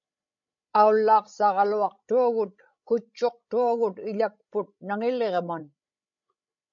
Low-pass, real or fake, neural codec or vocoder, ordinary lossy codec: 7.2 kHz; fake; codec, 16 kHz, 16 kbps, FreqCodec, larger model; MP3, 48 kbps